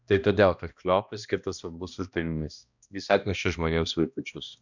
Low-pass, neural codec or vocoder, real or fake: 7.2 kHz; codec, 16 kHz, 1 kbps, X-Codec, HuBERT features, trained on balanced general audio; fake